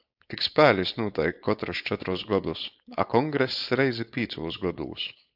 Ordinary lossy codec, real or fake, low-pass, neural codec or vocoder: AAC, 48 kbps; fake; 5.4 kHz; codec, 16 kHz, 4.8 kbps, FACodec